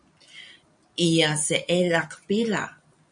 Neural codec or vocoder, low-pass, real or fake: none; 9.9 kHz; real